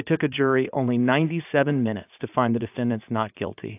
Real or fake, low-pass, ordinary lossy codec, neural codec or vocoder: fake; 3.6 kHz; AAC, 32 kbps; vocoder, 44.1 kHz, 80 mel bands, Vocos